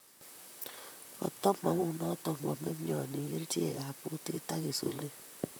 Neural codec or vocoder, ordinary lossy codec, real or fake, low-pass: vocoder, 44.1 kHz, 128 mel bands, Pupu-Vocoder; none; fake; none